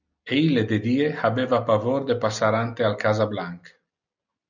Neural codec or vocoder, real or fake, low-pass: none; real; 7.2 kHz